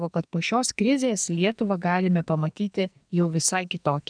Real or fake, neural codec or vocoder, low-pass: fake; codec, 44.1 kHz, 2.6 kbps, SNAC; 9.9 kHz